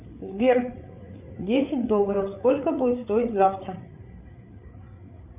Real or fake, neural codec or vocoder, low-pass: fake; codec, 16 kHz, 8 kbps, FreqCodec, larger model; 3.6 kHz